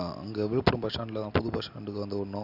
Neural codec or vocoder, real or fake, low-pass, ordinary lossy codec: none; real; 5.4 kHz; Opus, 64 kbps